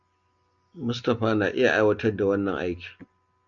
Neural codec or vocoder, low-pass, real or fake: none; 7.2 kHz; real